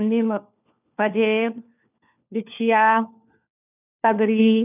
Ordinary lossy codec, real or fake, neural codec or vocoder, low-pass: none; fake; codec, 16 kHz, 4 kbps, FunCodec, trained on LibriTTS, 50 frames a second; 3.6 kHz